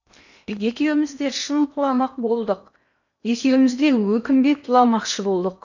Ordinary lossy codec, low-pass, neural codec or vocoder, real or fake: none; 7.2 kHz; codec, 16 kHz in and 24 kHz out, 0.8 kbps, FocalCodec, streaming, 65536 codes; fake